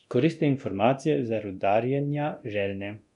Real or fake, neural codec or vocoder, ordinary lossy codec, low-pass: fake; codec, 24 kHz, 0.9 kbps, DualCodec; none; 10.8 kHz